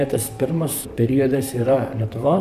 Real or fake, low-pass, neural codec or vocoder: fake; 14.4 kHz; vocoder, 44.1 kHz, 128 mel bands, Pupu-Vocoder